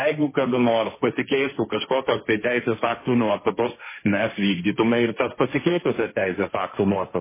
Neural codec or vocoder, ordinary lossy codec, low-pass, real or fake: codec, 16 kHz, 1.1 kbps, Voila-Tokenizer; MP3, 16 kbps; 3.6 kHz; fake